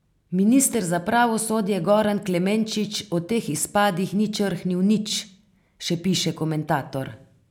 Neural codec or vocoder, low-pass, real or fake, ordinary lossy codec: none; 19.8 kHz; real; none